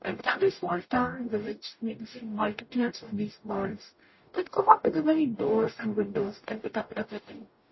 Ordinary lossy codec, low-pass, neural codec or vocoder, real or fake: MP3, 24 kbps; 7.2 kHz; codec, 44.1 kHz, 0.9 kbps, DAC; fake